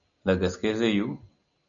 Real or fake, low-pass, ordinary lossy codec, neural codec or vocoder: real; 7.2 kHz; MP3, 96 kbps; none